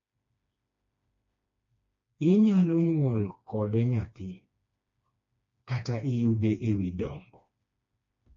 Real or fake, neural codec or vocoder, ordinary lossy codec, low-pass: fake; codec, 16 kHz, 2 kbps, FreqCodec, smaller model; MP3, 48 kbps; 7.2 kHz